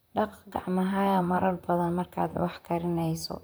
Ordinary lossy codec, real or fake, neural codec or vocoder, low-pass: none; fake; vocoder, 44.1 kHz, 128 mel bands, Pupu-Vocoder; none